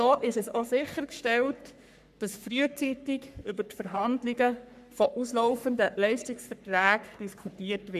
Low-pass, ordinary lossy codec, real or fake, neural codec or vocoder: 14.4 kHz; none; fake; codec, 44.1 kHz, 3.4 kbps, Pupu-Codec